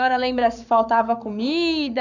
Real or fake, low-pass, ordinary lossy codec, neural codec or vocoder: fake; 7.2 kHz; none; codec, 44.1 kHz, 7.8 kbps, Pupu-Codec